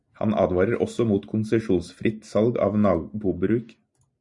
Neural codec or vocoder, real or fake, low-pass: none; real; 10.8 kHz